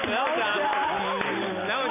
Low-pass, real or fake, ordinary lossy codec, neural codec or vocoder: 3.6 kHz; real; none; none